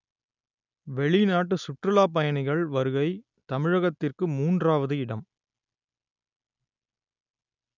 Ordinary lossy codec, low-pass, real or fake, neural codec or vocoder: none; 7.2 kHz; real; none